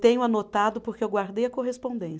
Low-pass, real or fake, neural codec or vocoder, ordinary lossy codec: none; real; none; none